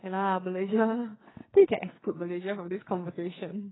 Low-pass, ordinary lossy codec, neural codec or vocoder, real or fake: 7.2 kHz; AAC, 16 kbps; codec, 44.1 kHz, 2.6 kbps, SNAC; fake